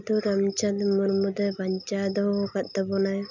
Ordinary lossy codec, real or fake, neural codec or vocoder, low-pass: none; real; none; 7.2 kHz